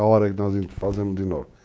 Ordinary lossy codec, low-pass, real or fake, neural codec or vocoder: none; none; fake; codec, 16 kHz, 6 kbps, DAC